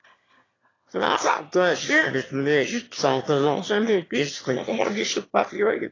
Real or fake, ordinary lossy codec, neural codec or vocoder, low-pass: fake; AAC, 32 kbps; autoencoder, 22.05 kHz, a latent of 192 numbers a frame, VITS, trained on one speaker; 7.2 kHz